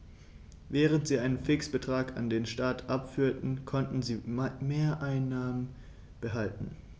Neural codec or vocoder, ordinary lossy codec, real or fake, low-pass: none; none; real; none